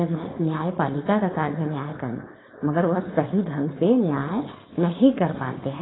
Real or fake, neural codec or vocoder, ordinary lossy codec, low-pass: fake; codec, 16 kHz, 4.8 kbps, FACodec; AAC, 16 kbps; 7.2 kHz